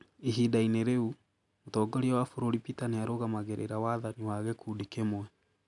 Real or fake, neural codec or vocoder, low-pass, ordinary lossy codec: real; none; 10.8 kHz; none